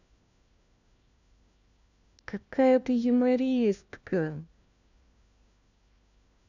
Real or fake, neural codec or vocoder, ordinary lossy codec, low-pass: fake; codec, 16 kHz, 1 kbps, FunCodec, trained on LibriTTS, 50 frames a second; none; 7.2 kHz